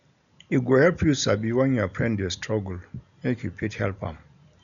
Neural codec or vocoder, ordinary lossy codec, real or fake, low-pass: none; none; real; 7.2 kHz